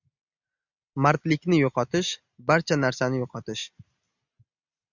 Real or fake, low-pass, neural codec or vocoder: real; 7.2 kHz; none